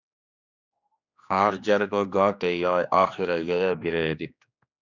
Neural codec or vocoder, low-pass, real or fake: codec, 16 kHz, 2 kbps, X-Codec, HuBERT features, trained on general audio; 7.2 kHz; fake